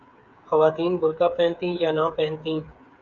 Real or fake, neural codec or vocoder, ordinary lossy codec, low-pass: fake; codec, 16 kHz, 8 kbps, FreqCodec, smaller model; Opus, 64 kbps; 7.2 kHz